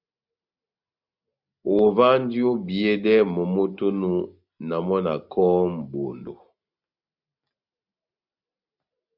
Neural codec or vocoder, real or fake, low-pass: none; real; 5.4 kHz